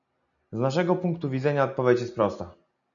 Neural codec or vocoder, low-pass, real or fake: none; 7.2 kHz; real